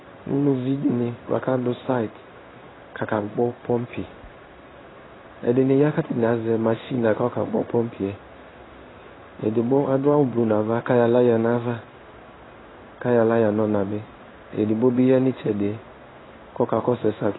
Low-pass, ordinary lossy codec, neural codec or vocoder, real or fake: 7.2 kHz; AAC, 16 kbps; codec, 16 kHz in and 24 kHz out, 1 kbps, XY-Tokenizer; fake